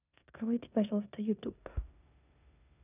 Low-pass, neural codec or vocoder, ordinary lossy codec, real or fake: 3.6 kHz; codec, 16 kHz in and 24 kHz out, 0.9 kbps, LongCat-Audio-Codec, four codebook decoder; none; fake